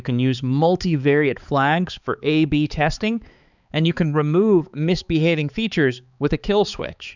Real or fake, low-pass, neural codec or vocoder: fake; 7.2 kHz; codec, 16 kHz, 4 kbps, X-Codec, HuBERT features, trained on balanced general audio